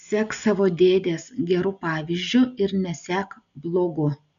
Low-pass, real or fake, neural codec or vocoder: 7.2 kHz; real; none